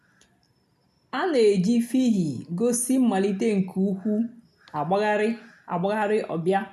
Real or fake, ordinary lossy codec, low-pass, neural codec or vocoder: real; none; 14.4 kHz; none